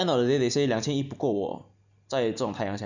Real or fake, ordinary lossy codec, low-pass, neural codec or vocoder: real; none; 7.2 kHz; none